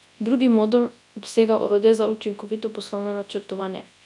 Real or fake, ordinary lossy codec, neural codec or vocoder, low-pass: fake; none; codec, 24 kHz, 0.9 kbps, WavTokenizer, large speech release; 10.8 kHz